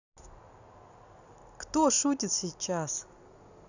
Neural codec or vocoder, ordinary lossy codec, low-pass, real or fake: none; none; 7.2 kHz; real